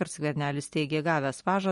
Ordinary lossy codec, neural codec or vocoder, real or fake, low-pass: MP3, 48 kbps; none; real; 19.8 kHz